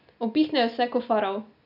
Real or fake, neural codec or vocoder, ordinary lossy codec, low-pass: real; none; none; 5.4 kHz